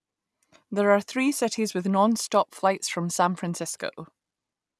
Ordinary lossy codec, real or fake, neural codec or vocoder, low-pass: none; real; none; none